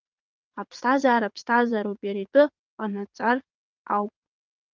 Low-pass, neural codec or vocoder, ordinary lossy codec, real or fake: 7.2 kHz; vocoder, 22.05 kHz, 80 mel bands, WaveNeXt; Opus, 24 kbps; fake